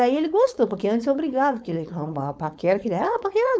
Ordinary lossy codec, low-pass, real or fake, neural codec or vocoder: none; none; fake; codec, 16 kHz, 4.8 kbps, FACodec